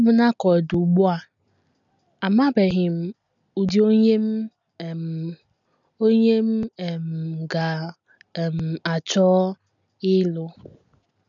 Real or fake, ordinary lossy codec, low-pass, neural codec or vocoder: real; none; 7.2 kHz; none